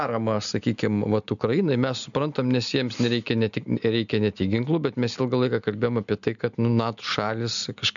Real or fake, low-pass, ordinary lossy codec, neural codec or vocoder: real; 7.2 kHz; MP3, 96 kbps; none